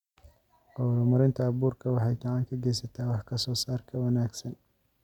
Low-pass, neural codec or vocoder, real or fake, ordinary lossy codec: 19.8 kHz; none; real; none